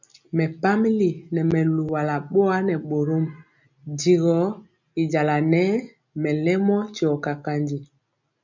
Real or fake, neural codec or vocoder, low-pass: real; none; 7.2 kHz